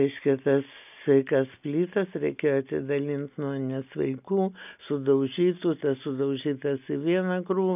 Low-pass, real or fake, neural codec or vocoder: 3.6 kHz; real; none